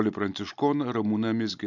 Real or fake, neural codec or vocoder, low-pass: real; none; 7.2 kHz